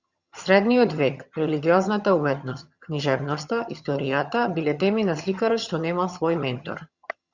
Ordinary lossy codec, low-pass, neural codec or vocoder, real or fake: Opus, 64 kbps; 7.2 kHz; vocoder, 22.05 kHz, 80 mel bands, HiFi-GAN; fake